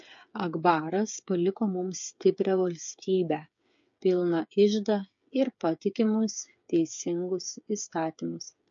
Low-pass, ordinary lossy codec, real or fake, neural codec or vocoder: 7.2 kHz; MP3, 48 kbps; fake; codec, 16 kHz, 8 kbps, FreqCodec, smaller model